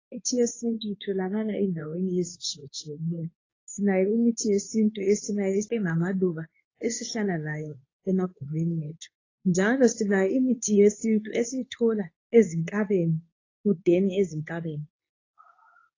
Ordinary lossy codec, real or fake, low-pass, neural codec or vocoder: AAC, 32 kbps; fake; 7.2 kHz; codec, 24 kHz, 0.9 kbps, WavTokenizer, medium speech release version 2